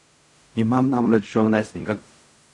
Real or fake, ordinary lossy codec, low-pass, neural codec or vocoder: fake; none; 10.8 kHz; codec, 16 kHz in and 24 kHz out, 0.4 kbps, LongCat-Audio-Codec, fine tuned four codebook decoder